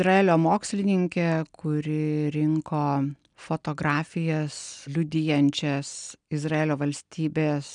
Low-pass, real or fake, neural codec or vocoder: 9.9 kHz; real; none